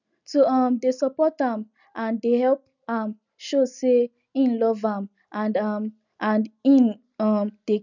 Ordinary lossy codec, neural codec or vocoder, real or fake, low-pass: none; none; real; 7.2 kHz